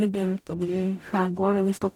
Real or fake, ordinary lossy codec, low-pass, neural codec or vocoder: fake; none; 19.8 kHz; codec, 44.1 kHz, 0.9 kbps, DAC